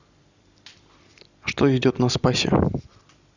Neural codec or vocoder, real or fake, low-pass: none; real; 7.2 kHz